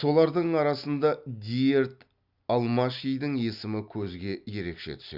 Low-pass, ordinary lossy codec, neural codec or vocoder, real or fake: 5.4 kHz; Opus, 64 kbps; none; real